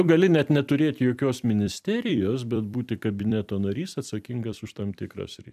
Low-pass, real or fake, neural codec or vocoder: 14.4 kHz; fake; vocoder, 44.1 kHz, 128 mel bands every 256 samples, BigVGAN v2